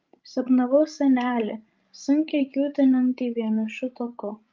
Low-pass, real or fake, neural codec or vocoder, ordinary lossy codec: 7.2 kHz; fake; codec, 16 kHz, 16 kbps, FreqCodec, larger model; Opus, 24 kbps